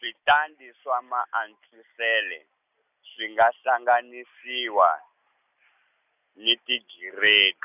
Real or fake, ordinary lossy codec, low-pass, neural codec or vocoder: real; none; 3.6 kHz; none